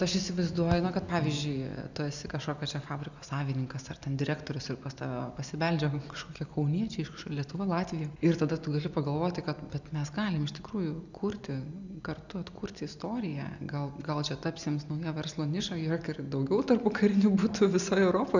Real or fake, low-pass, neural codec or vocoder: real; 7.2 kHz; none